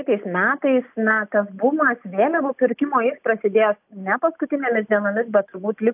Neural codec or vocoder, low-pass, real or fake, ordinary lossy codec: none; 3.6 kHz; real; AAC, 32 kbps